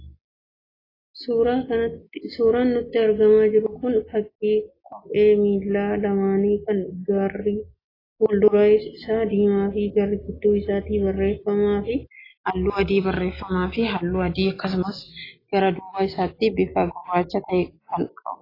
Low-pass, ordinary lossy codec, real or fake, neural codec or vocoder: 5.4 kHz; AAC, 24 kbps; real; none